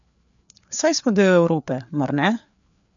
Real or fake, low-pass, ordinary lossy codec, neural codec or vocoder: fake; 7.2 kHz; none; codec, 16 kHz, 4 kbps, FreqCodec, larger model